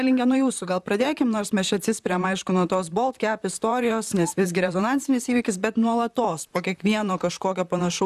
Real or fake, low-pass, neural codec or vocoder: fake; 14.4 kHz; vocoder, 44.1 kHz, 128 mel bands, Pupu-Vocoder